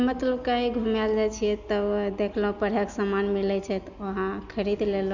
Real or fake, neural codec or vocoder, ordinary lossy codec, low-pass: real; none; none; 7.2 kHz